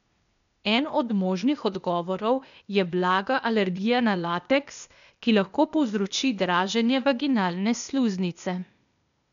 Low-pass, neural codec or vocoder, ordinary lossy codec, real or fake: 7.2 kHz; codec, 16 kHz, 0.8 kbps, ZipCodec; none; fake